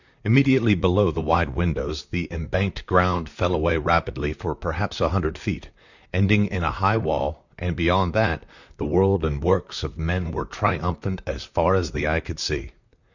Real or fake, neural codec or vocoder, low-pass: fake; vocoder, 44.1 kHz, 128 mel bands, Pupu-Vocoder; 7.2 kHz